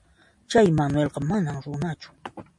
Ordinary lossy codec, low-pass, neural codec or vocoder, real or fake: MP3, 48 kbps; 10.8 kHz; none; real